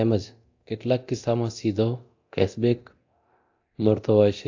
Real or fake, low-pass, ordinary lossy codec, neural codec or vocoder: fake; 7.2 kHz; AAC, 48 kbps; codec, 24 kHz, 0.9 kbps, DualCodec